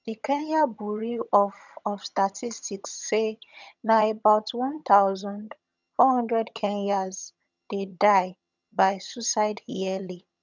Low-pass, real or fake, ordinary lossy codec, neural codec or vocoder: 7.2 kHz; fake; none; vocoder, 22.05 kHz, 80 mel bands, HiFi-GAN